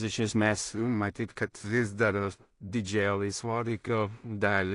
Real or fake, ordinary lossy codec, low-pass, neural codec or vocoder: fake; AAC, 48 kbps; 10.8 kHz; codec, 16 kHz in and 24 kHz out, 0.4 kbps, LongCat-Audio-Codec, two codebook decoder